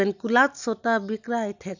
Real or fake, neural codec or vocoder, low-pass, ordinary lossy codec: real; none; 7.2 kHz; none